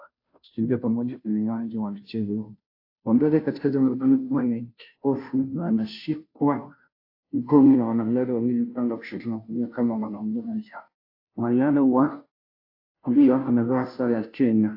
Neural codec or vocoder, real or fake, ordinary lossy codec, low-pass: codec, 16 kHz, 0.5 kbps, FunCodec, trained on Chinese and English, 25 frames a second; fake; AAC, 32 kbps; 5.4 kHz